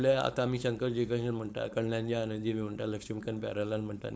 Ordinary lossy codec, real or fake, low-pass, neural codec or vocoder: none; fake; none; codec, 16 kHz, 4.8 kbps, FACodec